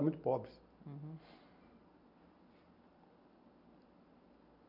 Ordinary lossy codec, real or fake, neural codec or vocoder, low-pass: none; real; none; 5.4 kHz